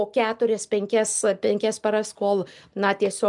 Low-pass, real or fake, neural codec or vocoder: 10.8 kHz; real; none